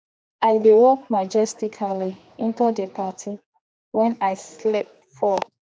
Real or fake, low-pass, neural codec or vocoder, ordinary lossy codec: fake; none; codec, 16 kHz, 2 kbps, X-Codec, HuBERT features, trained on general audio; none